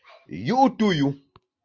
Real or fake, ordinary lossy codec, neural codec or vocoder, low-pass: real; Opus, 32 kbps; none; 7.2 kHz